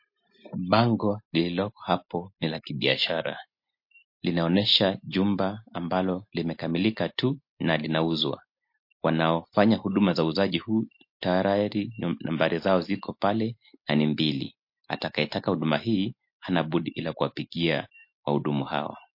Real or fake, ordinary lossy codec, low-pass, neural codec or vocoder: real; MP3, 32 kbps; 5.4 kHz; none